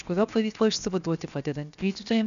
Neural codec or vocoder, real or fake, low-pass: codec, 16 kHz, 0.7 kbps, FocalCodec; fake; 7.2 kHz